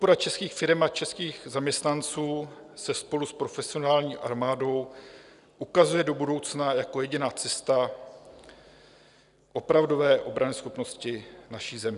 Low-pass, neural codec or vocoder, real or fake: 10.8 kHz; none; real